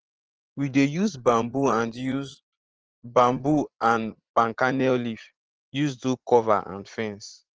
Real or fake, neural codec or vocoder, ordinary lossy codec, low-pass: fake; vocoder, 44.1 kHz, 80 mel bands, Vocos; Opus, 16 kbps; 7.2 kHz